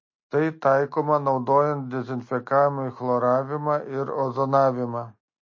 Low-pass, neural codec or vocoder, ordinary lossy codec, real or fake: 7.2 kHz; none; MP3, 32 kbps; real